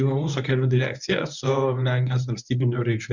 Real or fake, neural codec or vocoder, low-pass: fake; codec, 24 kHz, 0.9 kbps, WavTokenizer, medium speech release version 1; 7.2 kHz